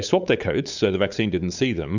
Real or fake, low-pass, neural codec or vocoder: fake; 7.2 kHz; codec, 16 kHz, 8 kbps, FunCodec, trained on LibriTTS, 25 frames a second